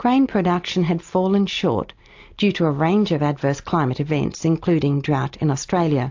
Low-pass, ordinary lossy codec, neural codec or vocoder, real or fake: 7.2 kHz; AAC, 48 kbps; none; real